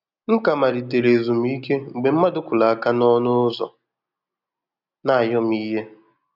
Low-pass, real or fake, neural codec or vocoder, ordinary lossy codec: 5.4 kHz; real; none; none